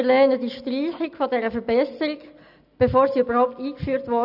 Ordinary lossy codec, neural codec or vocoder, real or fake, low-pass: none; none; real; 5.4 kHz